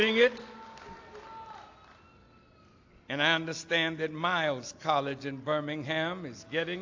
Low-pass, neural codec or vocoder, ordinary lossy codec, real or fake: 7.2 kHz; none; AAC, 48 kbps; real